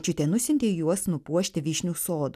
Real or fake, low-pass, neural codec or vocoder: real; 14.4 kHz; none